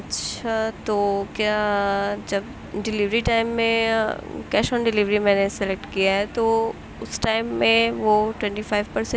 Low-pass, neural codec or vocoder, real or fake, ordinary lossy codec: none; none; real; none